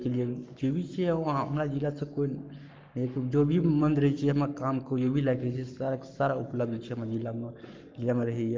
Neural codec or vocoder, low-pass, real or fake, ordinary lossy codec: codec, 44.1 kHz, 7.8 kbps, Pupu-Codec; 7.2 kHz; fake; Opus, 32 kbps